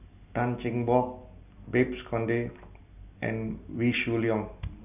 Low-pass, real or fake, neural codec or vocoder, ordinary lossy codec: 3.6 kHz; real; none; none